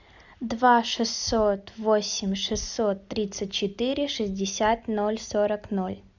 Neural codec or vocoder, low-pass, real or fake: none; 7.2 kHz; real